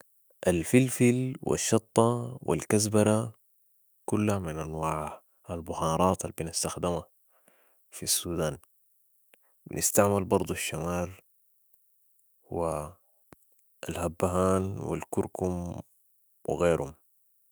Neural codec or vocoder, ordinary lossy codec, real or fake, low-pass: autoencoder, 48 kHz, 128 numbers a frame, DAC-VAE, trained on Japanese speech; none; fake; none